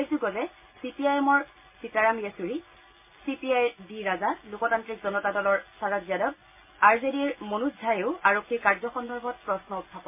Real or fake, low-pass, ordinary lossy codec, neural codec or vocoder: real; 3.6 kHz; MP3, 24 kbps; none